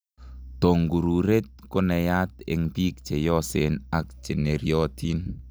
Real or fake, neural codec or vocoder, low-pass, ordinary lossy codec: real; none; none; none